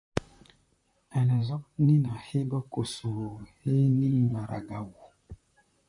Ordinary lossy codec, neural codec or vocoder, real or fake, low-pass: MP3, 64 kbps; vocoder, 44.1 kHz, 128 mel bands, Pupu-Vocoder; fake; 10.8 kHz